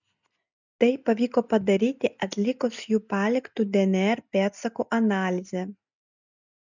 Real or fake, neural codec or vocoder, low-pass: real; none; 7.2 kHz